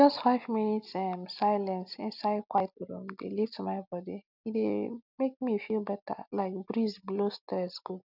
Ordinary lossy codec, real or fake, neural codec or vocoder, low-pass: none; real; none; 5.4 kHz